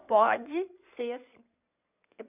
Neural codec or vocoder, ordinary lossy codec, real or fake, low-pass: codec, 16 kHz in and 24 kHz out, 2.2 kbps, FireRedTTS-2 codec; none; fake; 3.6 kHz